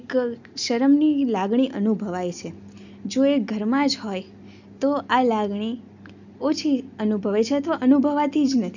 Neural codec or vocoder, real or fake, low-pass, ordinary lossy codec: none; real; 7.2 kHz; none